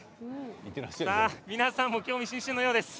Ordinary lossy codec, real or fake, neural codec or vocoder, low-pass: none; real; none; none